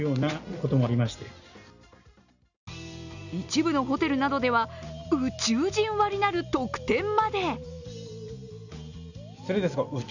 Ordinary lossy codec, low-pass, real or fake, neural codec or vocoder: none; 7.2 kHz; real; none